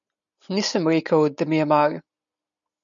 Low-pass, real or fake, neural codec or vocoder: 7.2 kHz; real; none